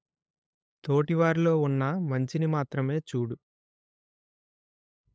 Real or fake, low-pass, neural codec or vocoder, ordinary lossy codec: fake; none; codec, 16 kHz, 8 kbps, FunCodec, trained on LibriTTS, 25 frames a second; none